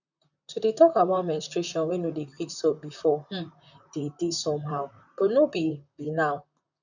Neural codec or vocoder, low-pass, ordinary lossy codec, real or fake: vocoder, 44.1 kHz, 128 mel bands, Pupu-Vocoder; 7.2 kHz; none; fake